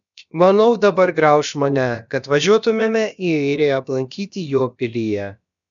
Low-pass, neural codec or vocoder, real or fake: 7.2 kHz; codec, 16 kHz, about 1 kbps, DyCAST, with the encoder's durations; fake